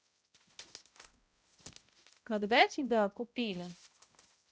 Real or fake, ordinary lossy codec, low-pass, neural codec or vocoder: fake; none; none; codec, 16 kHz, 0.5 kbps, X-Codec, HuBERT features, trained on balanced general audio